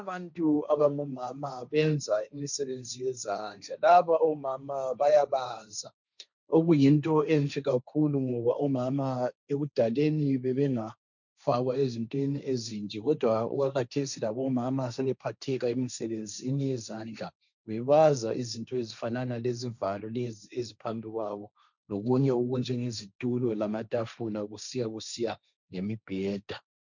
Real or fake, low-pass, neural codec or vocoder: fake; 7.2 kHz; codec, 16 kHz, 1.1 kbps, Voila-Tokenizer